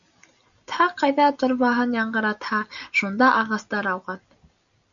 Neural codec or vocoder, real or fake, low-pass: none; real; 7.2 kHz